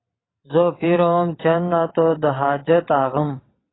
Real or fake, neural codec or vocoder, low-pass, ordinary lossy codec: fake; vocoder, 22.05 kHz, 80 mel bands, WaveNeXt; 7.2 kHz; AAC, 16 kbps